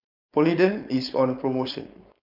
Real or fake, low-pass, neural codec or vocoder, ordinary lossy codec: fake; 5.4 kHz; codec, 16 kHz, 4.8 kbps, FACodec; none